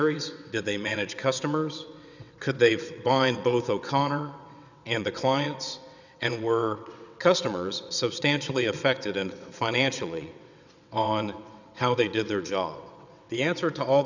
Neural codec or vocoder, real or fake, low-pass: vocoder, 22.05 kHz, 80 mel bands, WaveNeXt; fake; 7.2 kHz